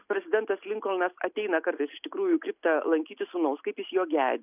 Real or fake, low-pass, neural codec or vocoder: real; 3.6 kHz; none